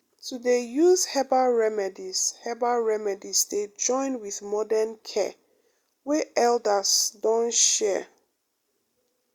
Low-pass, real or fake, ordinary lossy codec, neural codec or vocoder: 19.8 kHz; real; Opus, 64 kbps; none